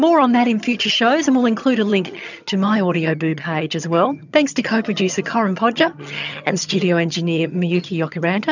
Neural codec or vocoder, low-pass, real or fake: vocoder, 22.05 kHz, 80 mel bands, HiFi-GAN; 7.2 kHz; fake